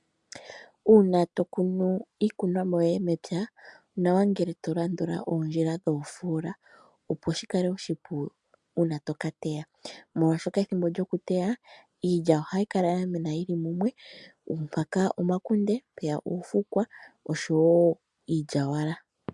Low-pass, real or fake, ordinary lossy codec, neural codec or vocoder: 10.8 kHz; real; MP3, 96 kbps; none